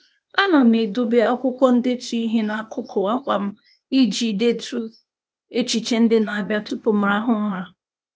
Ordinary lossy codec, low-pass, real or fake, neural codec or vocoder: none; none; fake; codec, 16 kHz, 0.8 kbps, ZipCodec